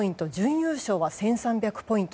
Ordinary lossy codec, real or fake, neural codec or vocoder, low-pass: none; real; none; none